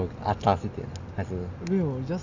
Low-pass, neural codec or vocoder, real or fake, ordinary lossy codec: 7.2 kHz; none; real; none